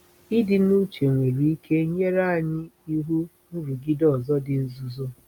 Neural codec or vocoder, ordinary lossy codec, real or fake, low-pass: none; none; real; 19.8 kHz